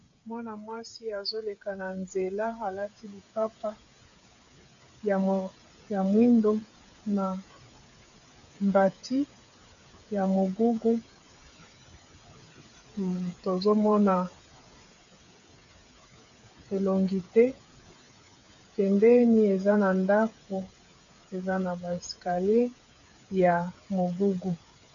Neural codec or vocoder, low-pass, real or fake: codec, 16 kHz, 8 kbps, FreqCodec, smaller model; 7.2 kHz; fake